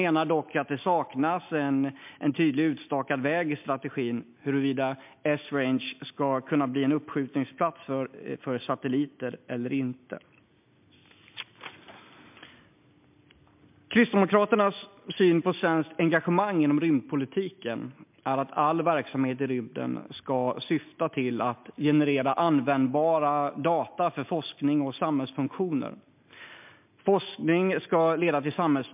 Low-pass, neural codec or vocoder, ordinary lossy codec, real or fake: 3.6 kHz; none; MP3, 32 kbps; real